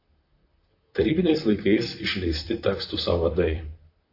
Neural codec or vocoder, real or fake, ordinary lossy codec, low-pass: vocoder, 44.1 kHz, 128 mel bands, Pupu-Vocoder; fake; AAC, 32 kbps; 5.4 kHz